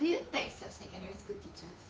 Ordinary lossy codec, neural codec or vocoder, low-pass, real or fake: none; codec, 16 kHz, 2 kbps, FunCodec, trained on Chinese and English, 25 frames a second; none; fake